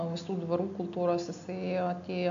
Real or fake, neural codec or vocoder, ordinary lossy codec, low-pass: real; none; AAC, 64 kbps; 7.2 kHz